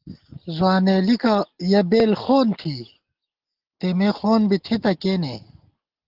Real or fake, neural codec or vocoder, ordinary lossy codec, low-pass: real; none; Opus, 16 kbps; 5.4 kHz